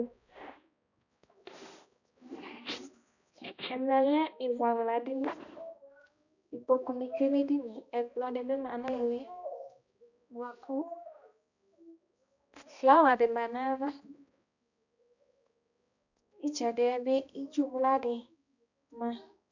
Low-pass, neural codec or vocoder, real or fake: 7.2 kHz; codec, 16 kHz, 1 kbps, X-Codec, HuBERT features, trained on balanced general audio; fake